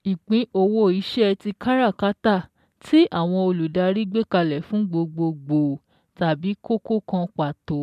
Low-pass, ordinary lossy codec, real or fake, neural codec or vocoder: 14.4 kHz; MP3, 96 kbps; real; none